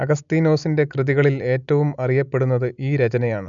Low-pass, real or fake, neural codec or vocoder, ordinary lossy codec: 7.2 kHz; real; none; none